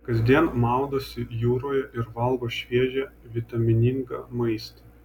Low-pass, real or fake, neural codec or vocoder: 19.8 kHz; real; none